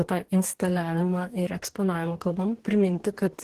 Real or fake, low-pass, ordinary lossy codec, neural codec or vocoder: fake; 14.4 kHz; Opus, 16 kbps; codec, 44.1 kHz, 2.6 kbps, DAC